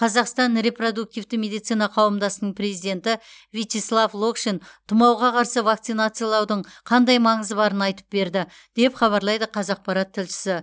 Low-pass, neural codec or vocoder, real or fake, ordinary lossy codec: none; none; real; none